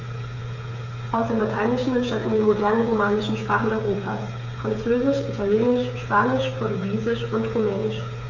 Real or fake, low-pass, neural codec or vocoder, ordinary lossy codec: fake; 7.2 kHz; codec, 16 kHz, 8 kbps, FreqCodec, smaller model; none